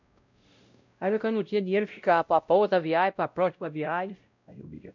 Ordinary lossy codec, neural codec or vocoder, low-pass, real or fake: none; codec, 16 kHz, 0.5 kbps, X-Codec, WavLM features, trained on Multilingual LibriSpeech; 7.2 kHz; fake